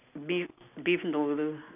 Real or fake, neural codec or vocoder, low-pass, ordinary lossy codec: real; none; 3.6 kHz; none